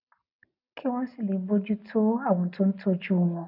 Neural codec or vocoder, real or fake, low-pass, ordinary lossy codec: none; real; 5.4 kHz; none